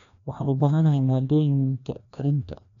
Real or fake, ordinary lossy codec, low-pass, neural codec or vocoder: fake; none; 7.2 kHz; codec, 16 kHz, 1 kbps, FreqCodec, larger model